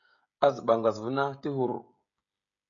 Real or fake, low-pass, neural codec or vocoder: fake; 7.2 kHz; codec, 16 kHz, 16 kbps, FreqCodec, smaller model